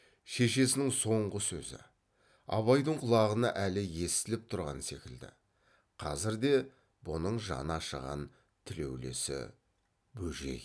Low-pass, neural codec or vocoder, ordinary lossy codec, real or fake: none; none; none; real